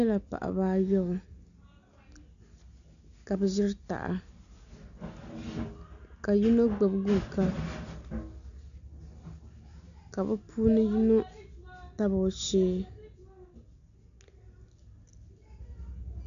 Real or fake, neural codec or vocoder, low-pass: real; none; 7.2 kHz